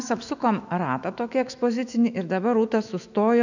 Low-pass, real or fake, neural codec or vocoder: 7.2 kHz; fake; autoencoder, 48 kHz, 128 numbers a frame, DAC-VAE, trained on Japanese speech